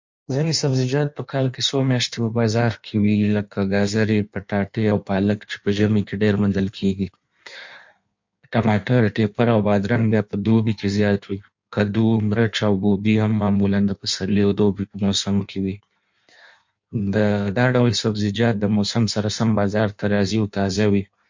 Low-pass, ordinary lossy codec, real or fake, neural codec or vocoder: 7.2 kHz; MP3, 48 kbps; fake; codec, 16 kHz in and 24 kHz out, 1.1 kbps, FireRedTTS-2 codec